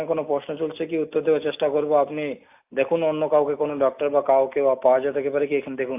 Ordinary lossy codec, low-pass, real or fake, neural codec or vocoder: AAC, 32 kbps; 3.6 kHz; real; none